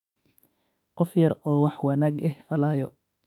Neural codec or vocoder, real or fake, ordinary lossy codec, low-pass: autoencoder, 48 kHz, 32 numbers a frame, DAC-VAE, trained on Japanese speech; fake; none; 19.8 kHz